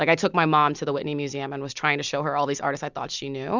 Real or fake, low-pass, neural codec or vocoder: real; 7.2 kHz; none